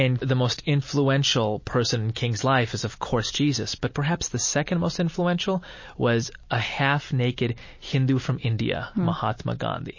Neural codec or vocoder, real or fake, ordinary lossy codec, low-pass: none; real; MP3, 32 kbps; 7.2 kHz